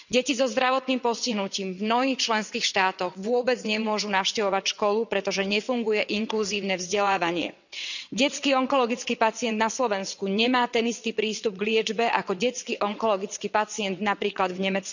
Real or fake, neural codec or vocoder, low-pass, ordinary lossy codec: fake; vocoder, 22.05 kHz, 80 mel bands, WaveNeXt; 7.2 kHz; none